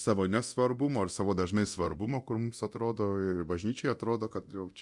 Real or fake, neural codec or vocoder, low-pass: fake; codec, 24 kHz, 0.9 kbps, DualCodec; 10.8 kHz